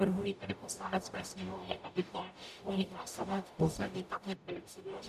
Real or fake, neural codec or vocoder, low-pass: fake; codec, 44.1 kHz, 0.9 kbps, DAC; 14.4 kHz